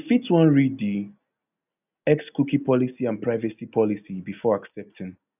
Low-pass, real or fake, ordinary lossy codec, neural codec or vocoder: 3.6 kHz; real; none; none